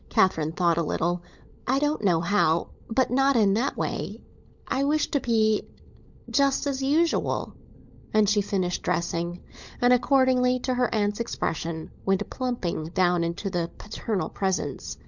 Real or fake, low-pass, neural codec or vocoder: fake; 7.2 kHz; codec, 16 kHz, 16 kbps, FunCodec, trained on LibriTTS, 50 frames a second